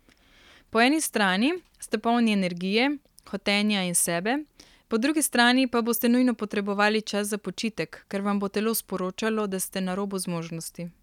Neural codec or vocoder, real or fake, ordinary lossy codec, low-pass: none; real; none; 19.8 kHz